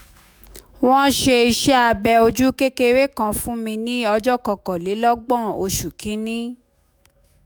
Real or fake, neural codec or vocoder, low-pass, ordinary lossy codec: fake; autoencoder, 48 kHz, 128 numbers a frame, DAC-VAE, trained on Japanese speech; none; none